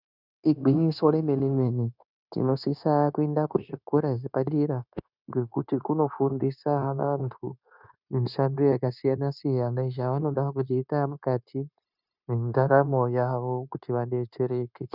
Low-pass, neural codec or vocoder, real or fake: 5.4 kHz; codec, 16 kHz, 0.9 kbps, LongCat-Audio-Codec; fake